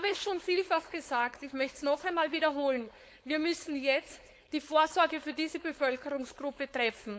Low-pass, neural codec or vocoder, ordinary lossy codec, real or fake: none; codec, 16 kHz, 4.8 kbps, FACodec; none; fake